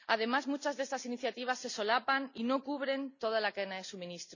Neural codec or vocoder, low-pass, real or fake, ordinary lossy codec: none; 7.2 kHz; real; none